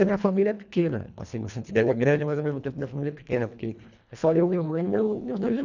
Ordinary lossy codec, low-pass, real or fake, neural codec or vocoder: none; 7.2 kHz; fake; codec, 24 kHz, 1.5 kbps, HILCodec